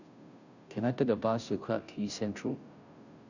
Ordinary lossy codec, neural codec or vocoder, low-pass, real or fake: none; codec, 16 kHz, 0.5 kbps, FunCodec, trained on Chinese and English, 25 frames a second; 7.2 kHz; fake